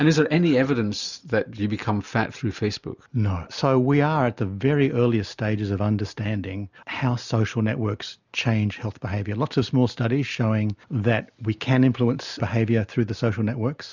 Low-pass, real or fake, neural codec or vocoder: 7.2 kHz; real; none